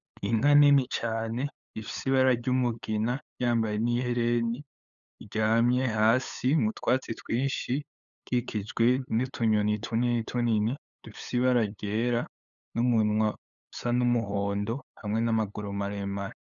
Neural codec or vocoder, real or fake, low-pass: codec, 16 kHz, 8 kbps, FunCodec, trained on LibriTTS, 25 frames a second; fake; 7.2 kHz